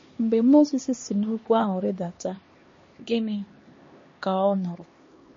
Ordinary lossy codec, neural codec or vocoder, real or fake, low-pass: MP3, 32 kbps; codec, 16 kHz, 2 kbps, X-Codec, HuBERT features, trained on LibriSpeech; fake; 7.2 kHz